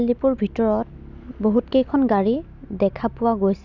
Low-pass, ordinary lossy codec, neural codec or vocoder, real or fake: 7.2 kHz; none; none; real